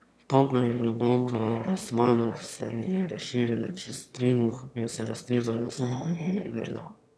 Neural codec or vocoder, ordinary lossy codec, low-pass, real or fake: autoencoder, 22.05 kHz, a latent of 192 numbers a frame, VITS, trained on one speaker; none; none; fake